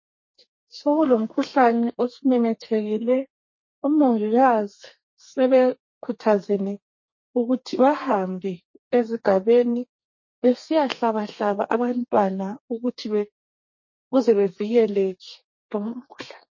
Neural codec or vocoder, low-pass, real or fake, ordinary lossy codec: codec, 32 kHz, 1.9 kbps, SNAC; 7.2 kHz; fake; MP3, 32 kbps